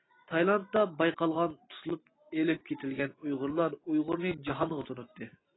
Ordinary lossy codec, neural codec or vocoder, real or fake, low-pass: AAC, 16 kbps; none; real; 7.2 kHz